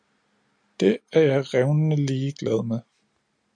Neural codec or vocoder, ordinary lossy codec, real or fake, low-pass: none; MP3, 64 kbps; real; 9.9 kHz